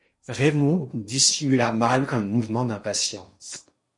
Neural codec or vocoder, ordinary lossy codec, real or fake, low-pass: codec, 16 kHz in and 24 kHz out, 0.8 kbps, FocalCodec, streaming, 65536 codes; MP3, 48 kbps; fake; 10.8 kHz